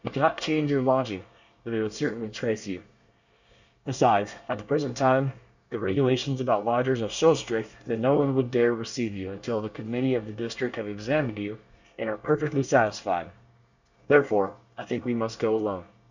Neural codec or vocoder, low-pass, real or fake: codec, 24 kHz, 1 kbps, SNAC; 7.2 kHz; fake